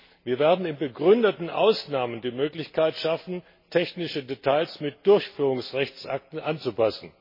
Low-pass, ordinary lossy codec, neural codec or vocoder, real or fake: 5.4 kHz; MP3, 24 kbps; none; real